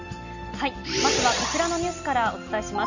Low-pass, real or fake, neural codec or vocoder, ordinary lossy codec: 7.2 kHz; real; none; none